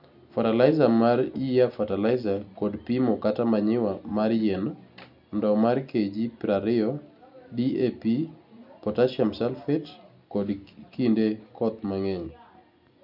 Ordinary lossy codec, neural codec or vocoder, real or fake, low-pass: none; none; real; 5.4 kHz